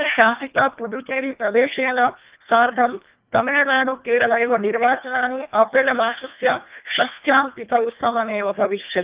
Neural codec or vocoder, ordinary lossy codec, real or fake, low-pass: codec, 24 kHz, 1.5 kbps, HILCodec; Opus, 24 kbps; fake; 3.6 kHz